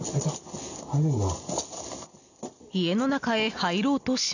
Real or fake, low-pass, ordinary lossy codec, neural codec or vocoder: real; 7.2 kHz; none; none